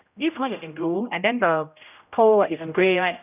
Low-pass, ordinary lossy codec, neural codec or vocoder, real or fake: 3.6 kHz; none; codec, 16 kHz, 0.5 kbps, X-Codec, HuBERT features, trained on general audio; fake